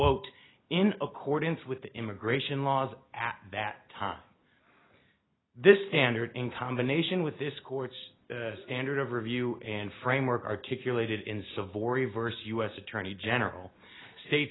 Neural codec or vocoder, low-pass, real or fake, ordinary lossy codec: none; 7.2 kHz; real; AAC, 16 kbps